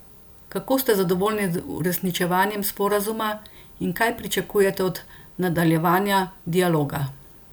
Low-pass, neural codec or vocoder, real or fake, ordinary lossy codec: none; none; real; none